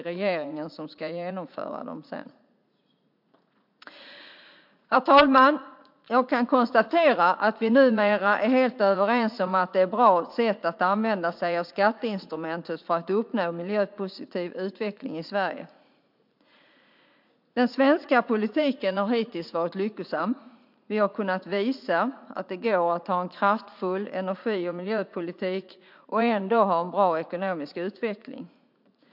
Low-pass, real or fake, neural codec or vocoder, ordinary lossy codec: 5.4 kHz; fake; vocoder, 44.1 kHz, 80 mel bands, Vocos; MP3, 48 kbps